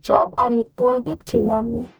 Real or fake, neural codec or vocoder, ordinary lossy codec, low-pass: fake; codec, 44.1 kHz, 0.9 kbps, DAC; none; none